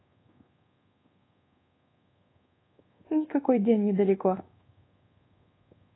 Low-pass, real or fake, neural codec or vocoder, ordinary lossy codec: 7.2 kHz; fake; codec, 24 kHz, 1.2 kbps, DualCodec; AAC, 16 kbps